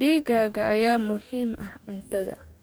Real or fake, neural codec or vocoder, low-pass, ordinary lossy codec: fake; codec, 44.1 kHz, 2.6 kbps, DAC; none; none